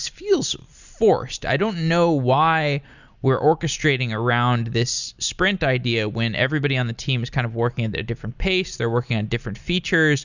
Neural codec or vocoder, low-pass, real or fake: none; 7.2 kHz; real